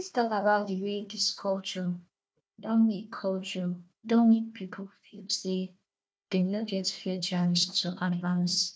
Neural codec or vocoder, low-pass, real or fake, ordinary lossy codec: codec, 16 kHz, 1 kbps, FunCodec, trained on Chinese and English, 50 frames a second; none; fake; none